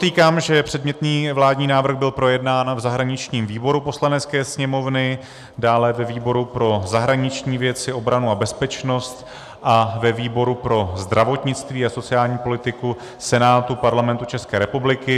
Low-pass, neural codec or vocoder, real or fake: 14.4 kHz; none; real